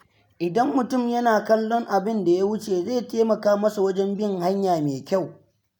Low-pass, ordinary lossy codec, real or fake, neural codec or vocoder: none; none; real; none